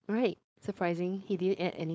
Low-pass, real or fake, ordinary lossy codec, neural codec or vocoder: none; fake; none; codec, 16 kHz, 4.8 kbps, FACodec